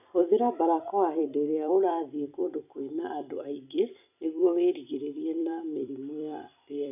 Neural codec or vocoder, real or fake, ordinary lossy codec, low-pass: vocoder, 44.1 kHz, 128 mel bands every 256 samples, BigVGAN v2; fake; none; 3.6 kHz